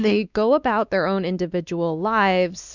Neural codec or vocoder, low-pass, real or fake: codec, 16 kHz, 2 kbps, X-Codec, WavLM features, trained on Multilingual LibriSpeech; 7.2 kHz; fake